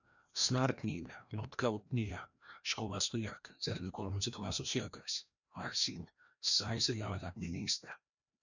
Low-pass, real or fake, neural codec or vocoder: 7.2 kHz; fake; codec, 16 kHz, 1 kbps, FreqCodec, larger model